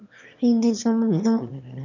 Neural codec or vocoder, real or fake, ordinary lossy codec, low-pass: autoencoder, 22.05 kHz, a latent of 192 numbers a frame, VITS, trained on one speaker; fake; none; 7.2 kHz